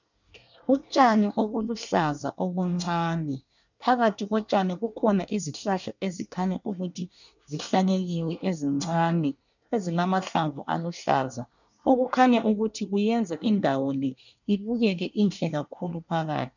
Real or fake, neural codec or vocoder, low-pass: fake; codec, 24 kHz, 1 kbps, SNAC; 7.2 kHz